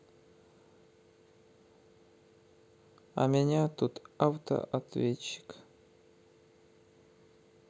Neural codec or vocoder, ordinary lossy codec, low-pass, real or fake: none; none; none; real